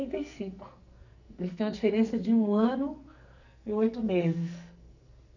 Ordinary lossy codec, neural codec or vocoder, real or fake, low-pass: none; codec, 44.1 kHz, 2.6 kbps, SNAC; fake; 7.2 kHz